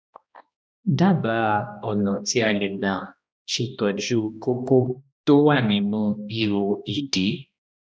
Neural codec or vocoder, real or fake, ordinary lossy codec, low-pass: codec, 16 kHz, 1 kbps, X-Codec, HuBERT features, trained on balanced general audio; fake; none; none